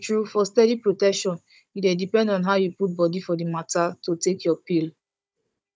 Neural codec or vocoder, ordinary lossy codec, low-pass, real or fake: codec, 16 kHz, 16 kbps, FunCodec, trained on Chinese and English, 50 frames a second; none; none; fake